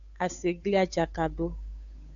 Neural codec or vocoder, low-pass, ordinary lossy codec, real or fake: codec, 16 kHz, 8 kbps, FunCodec, trained on Chinese and English, 25 frames a second; 7.2 kHz; AAC, 64 kbps; fake